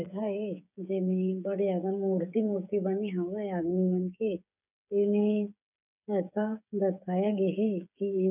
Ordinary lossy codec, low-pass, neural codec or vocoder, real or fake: none; 3.6 kHz; codec, 16 kHz, 8 kbps, FreqCodec, smaller model; fake